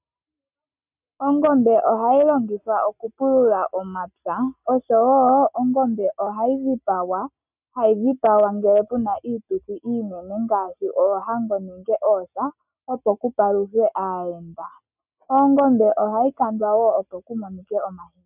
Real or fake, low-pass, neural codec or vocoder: real; 3.6 kHz; none